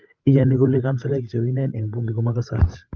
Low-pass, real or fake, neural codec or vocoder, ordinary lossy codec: 7.2 kHz; fake; codec, 16 kHz, 8 kbps, FreqCodec, larger model; Opus, 32 kbps